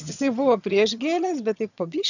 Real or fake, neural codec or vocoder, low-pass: fake; vocoder, 22.05 kHz, 80 mel bands, HiFi-GAN; 7.2 kHz